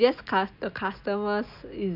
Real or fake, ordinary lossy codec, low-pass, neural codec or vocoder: real; none; 5.4 kHz; none